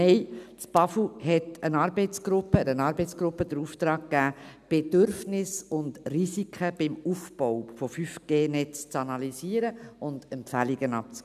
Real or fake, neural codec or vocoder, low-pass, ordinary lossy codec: real; none; 14.4 kHz; none